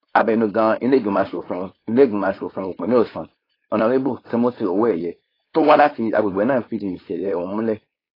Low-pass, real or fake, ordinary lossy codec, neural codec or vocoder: 5.4 kHz; fake; AAC, 24 kbps; codec, 16 kHz, 4.8 kbps, FACodec